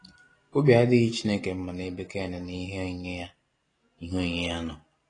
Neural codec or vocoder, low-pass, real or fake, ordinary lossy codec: none; 9.9 kHz; real; AAC, 32 kbps